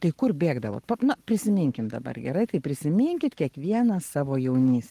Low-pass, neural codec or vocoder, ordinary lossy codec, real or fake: 14.4 kHz; codec, 44.1 kHz, 7.8 kbps, Pupu-Codec; Opus, 24 kbps; fake